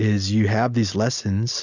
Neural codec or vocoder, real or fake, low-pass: none; real; 7.2 kHz